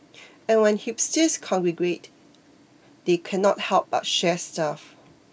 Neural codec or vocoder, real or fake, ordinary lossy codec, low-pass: none; real; none; none